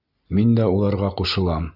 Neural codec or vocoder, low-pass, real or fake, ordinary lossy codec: none; 5.4 kHz; real; Opus, 64 kbps